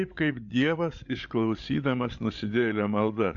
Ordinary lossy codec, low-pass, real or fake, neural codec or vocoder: MP3, 64 kbps; 7.2 kHz; fake; codec, 16 kHz, 8 kbps, FreqCodec, larger model